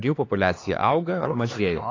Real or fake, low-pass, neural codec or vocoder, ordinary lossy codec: fake; 7.2 kHz; codec, 16 kHz, 4 kbps, X-Codec, HuBERT features, trained on LibriSpeech; AAC, 32 kbps